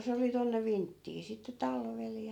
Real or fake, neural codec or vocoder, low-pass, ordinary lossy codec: real; none; 19.8 kHz; none